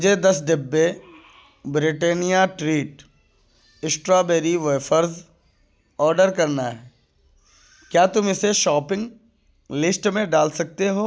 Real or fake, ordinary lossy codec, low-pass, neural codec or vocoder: real; none; none; none